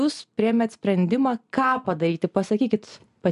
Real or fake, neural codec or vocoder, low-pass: real; none; 10.8 kHz